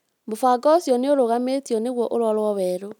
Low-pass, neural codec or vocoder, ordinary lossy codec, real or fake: 19.8 kHz; none; none; real